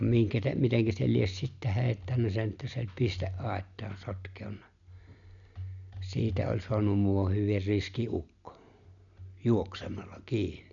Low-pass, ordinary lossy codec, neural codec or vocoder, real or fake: 7.2 kHz; none; none; real